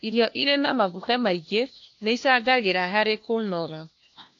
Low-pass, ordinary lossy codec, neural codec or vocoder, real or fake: 7.2 kHz; AAC, 48 kbps; codec, 16 kHz, 1 kbps, FunCodec, trained on LibriTTS, 50 frames a second; fake